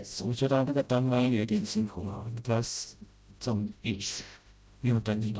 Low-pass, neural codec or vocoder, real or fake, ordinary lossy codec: none; codec, 16 kHz, 0.5 kbps, FreqCodec, smaller model; fake; none